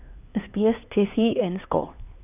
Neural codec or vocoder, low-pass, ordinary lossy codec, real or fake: codec, 16 kHz, 2 kbps, X-Codec, HuBERT features, trained on LibriSpeech; 3.6 kHz; none; fake